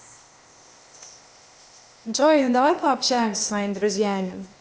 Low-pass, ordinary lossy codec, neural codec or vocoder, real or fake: none; none; codec, 16 kHz, 0.8 kbps, ZipCodec; fake